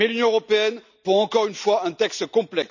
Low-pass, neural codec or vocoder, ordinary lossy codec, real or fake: 7.2 kHz; none; none; real